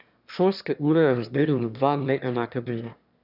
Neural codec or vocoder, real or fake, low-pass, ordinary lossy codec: autoencoder, 22.05 kHz, a latent of 192 numbers a frame, VITS, trained on one speaker; fake; 5.4 kHz; none